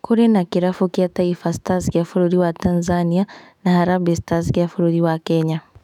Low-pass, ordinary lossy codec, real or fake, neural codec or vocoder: 19.8 kHz; none; fake; autoencoder, 48 kHz, 128 numbers a frame, DAC-VAE, trained on Japanese speech